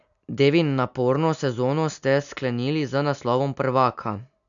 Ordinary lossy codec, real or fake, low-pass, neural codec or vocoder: none; real; 7.2 kHz; none